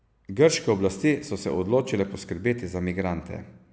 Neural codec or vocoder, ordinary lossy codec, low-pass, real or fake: none; none; none; real